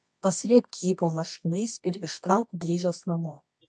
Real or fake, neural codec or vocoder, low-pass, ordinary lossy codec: fake; codec, 24 kHz, 0.9 kbps, WavTokenizer, medium music audio release; 10.8 kHz; MP3, 64 kbps